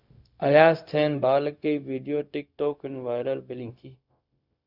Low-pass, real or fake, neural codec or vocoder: 5.4 kHz; fake; codec, 16 kHz, 0.4 kbps, LongCat-Audio-Codec